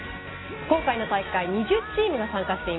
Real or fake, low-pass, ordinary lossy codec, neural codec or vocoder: real; 7.2 kHz; AAC, 16 kbps; none